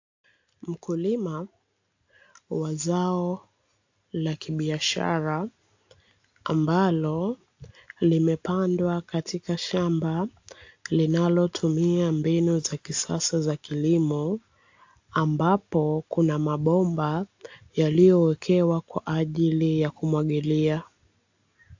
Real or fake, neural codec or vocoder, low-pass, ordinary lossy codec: real; none; 7.2 kHz; AAC, 48 kbps